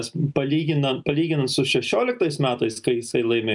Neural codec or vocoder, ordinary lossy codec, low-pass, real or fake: none; MP3, 96 kbps; 10.8 kHz; real